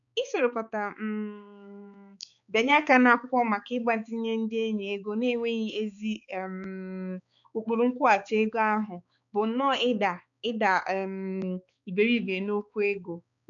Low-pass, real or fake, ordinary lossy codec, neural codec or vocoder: 7.2 kHz; fake; none; codec, 16 kHz, 4 kbps, X-Codec, HuBERT features, trained on balanced general audio